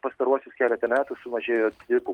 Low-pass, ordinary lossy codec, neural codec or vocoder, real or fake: 19.8 kHz; Opus, 24 kbps; none; real